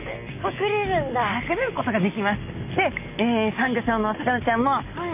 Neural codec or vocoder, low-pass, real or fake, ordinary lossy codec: none; 3.6 kHz; real; none